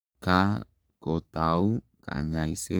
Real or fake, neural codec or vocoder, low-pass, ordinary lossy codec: fake; codec, 44.1 kHz, 3.4 kbps, Pupu-Codec; none; none